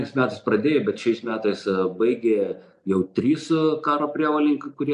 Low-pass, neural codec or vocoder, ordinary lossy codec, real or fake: 10.8 kHz; none; AAC, 96 kbps; real